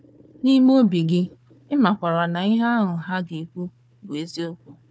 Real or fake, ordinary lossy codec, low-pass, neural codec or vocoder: fake; none; none; codec, 16 kHz, 4 kbps, FunCodec, trained on Chinese and English, 50 frames a second